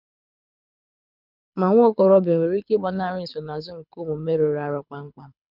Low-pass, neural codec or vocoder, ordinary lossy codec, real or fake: 5.4 kHz; codec, 24 kHz, 6 kbps, HILCodec; none; fake